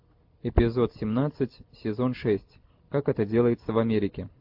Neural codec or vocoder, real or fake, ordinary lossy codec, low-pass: none; real; AAC, 48 kbps; 5.4 kHz